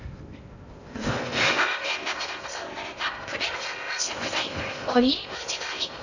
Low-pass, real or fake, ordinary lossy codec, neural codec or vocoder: 7.2 kHz; fake; none; codec, 16 kHz in and 24 kHz out, 0.6 kbps, FocalCodec, streaming, 2048 codes